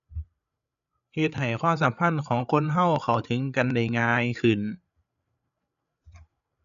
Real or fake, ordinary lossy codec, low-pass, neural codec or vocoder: fake; none; 7.2 kHz; codec, 16 kHz, 8 kbps, FreqCodec, larger model